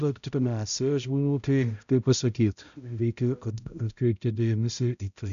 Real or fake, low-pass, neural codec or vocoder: fake; 7.2 kHz; codec, 16 kHz, 0.5 kbps, X-Codec, HuBERT features, trained on balanced general audio